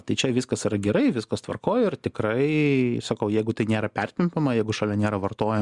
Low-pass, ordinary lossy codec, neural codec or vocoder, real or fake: 10.8 kHz; Opus, 64 kbps; none; real